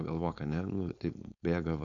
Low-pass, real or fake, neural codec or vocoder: 7.2 kHz; fake; codec, 16 kHz, 4.8 kbps, FACodec